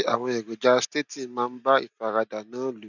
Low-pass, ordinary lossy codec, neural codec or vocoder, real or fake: 7.2 kHz; none; none; real